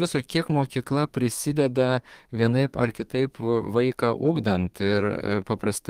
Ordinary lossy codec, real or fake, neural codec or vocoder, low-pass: Opus, 32 kbps; fake; codec, 32 kHz, 1.9 kbps, SNAC; 14.4 kHz